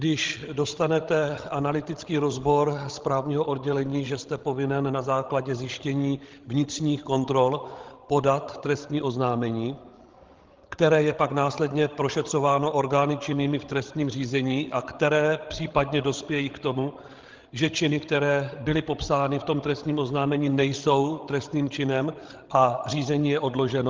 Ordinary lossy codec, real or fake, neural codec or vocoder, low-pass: Opus, 16 kbps; fake; codec, 16 kHz, 16 kbps, FreqCodec, larger model; 7.2 kHz